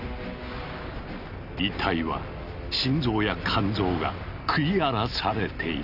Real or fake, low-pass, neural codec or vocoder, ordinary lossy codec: real; 5.4 kHz; none; Opus, 64 kbps